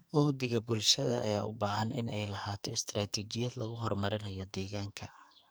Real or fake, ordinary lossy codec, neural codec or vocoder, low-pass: fake; none; codec, 44.1 kHz, 2.6 kbps, SNAC; none